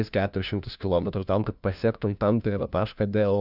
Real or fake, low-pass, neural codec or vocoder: fake; 5.4 kHz; codec, 16 kHz, 1 kbps, FunCodec, trained on LibriTTS, 50 frames a second